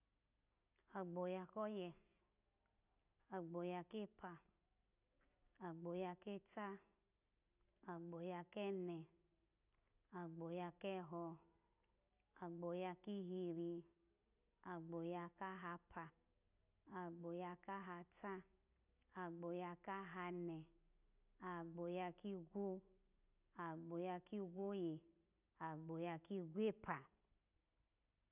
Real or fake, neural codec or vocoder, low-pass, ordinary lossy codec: real; none; 3.6 kHz; none